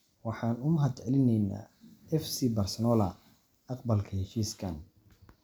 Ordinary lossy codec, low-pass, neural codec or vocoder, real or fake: none; none; none; real